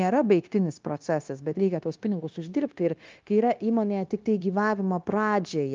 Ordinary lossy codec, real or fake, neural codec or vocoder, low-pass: Opus, 32 kbps; fake; codec, 16 kHz, 0.9 kbps, LongCat-Audio-Codec; 7.2 kHz